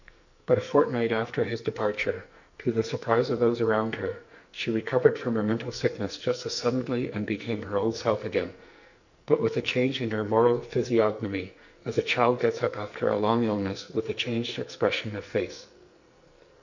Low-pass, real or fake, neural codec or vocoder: 7.2 kHz; fake; codec, 44.1 kHz, 2.6 kbps, SNAC